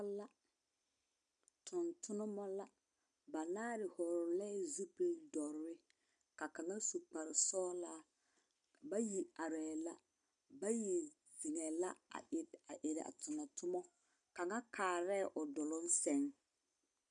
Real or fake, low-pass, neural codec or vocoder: real; 9.9 kHz; none